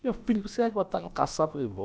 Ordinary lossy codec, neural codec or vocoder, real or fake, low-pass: none; codec, 16 kHz, about 1 kbps, DyCAST, with the encoder's durations; fake; none